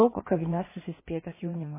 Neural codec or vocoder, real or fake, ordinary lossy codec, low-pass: codec, 16 kHz in and 24 kHz out, 1.1 kbps, FireRedTTS-2 codec; fake; MP3, 16 kbps; 3.6 kHz